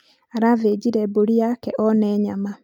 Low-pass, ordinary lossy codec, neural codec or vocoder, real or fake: 19.8 kHz; none; none; real